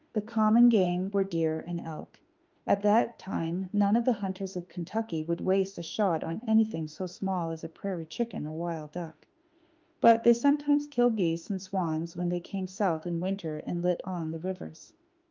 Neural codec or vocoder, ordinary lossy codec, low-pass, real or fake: autoencoder, 48 kHz, 32 numbers a frame, DAC-VAE, trained on Japanese speech; Opus, 24 kbps; 7.2 kHz; fake